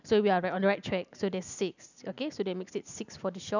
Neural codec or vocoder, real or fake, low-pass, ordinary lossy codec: none; real; 7.2 kHz; none